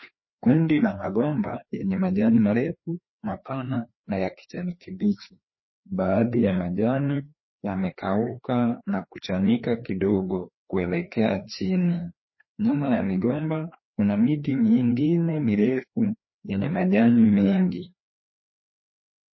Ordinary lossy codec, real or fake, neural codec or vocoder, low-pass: MP3, 24 kbps; fake; codec, 16 kHz, 2 kbps, FreqCodec, larger model; 7.2 kHz